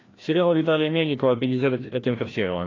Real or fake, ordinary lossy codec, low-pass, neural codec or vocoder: fake; AAC, 32 kbps; 7.2 kHz; codec, 16 kHz, 1 kbps, FreqCodec, larger model